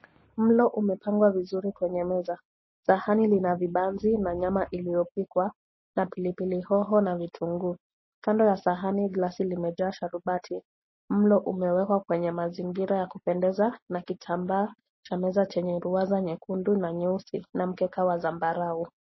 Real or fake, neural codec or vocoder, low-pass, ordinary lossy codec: real; none; 7.2 kHz; MP3, 24 kbps